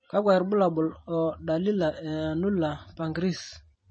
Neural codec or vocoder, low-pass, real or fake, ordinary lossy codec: vocoder, 44.1 kHz, 128 mel bands every 256 samples, BigVGAN v2; 9.9 kHz; fake; MP3, 32 kbps